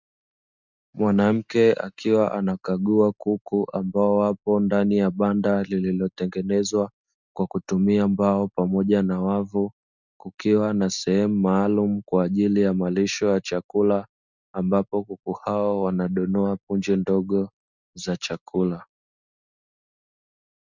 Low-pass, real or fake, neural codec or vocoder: 7.2 kHz; real; none